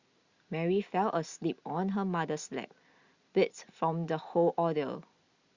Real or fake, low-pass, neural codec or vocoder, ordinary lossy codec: real; 7.2 kHz; none; Opus, 64 kbps